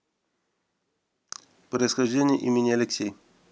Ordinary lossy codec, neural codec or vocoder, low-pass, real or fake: none; none; none; real